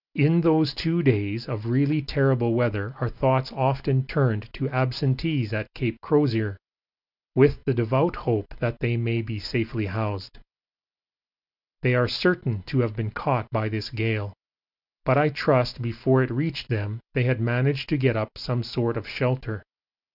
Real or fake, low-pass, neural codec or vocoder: real; 5.4 kHz; none